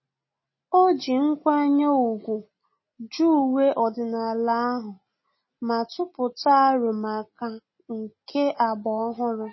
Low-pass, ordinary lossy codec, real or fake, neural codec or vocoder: 7.2 kHz; MP3, 24 kbps; real; none